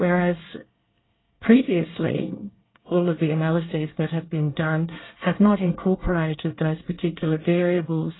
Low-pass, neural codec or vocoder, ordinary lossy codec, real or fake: 7.2 kHz; codec, 24 kHz, 1 kbps, SNAC; AAC, 16 kbps; fake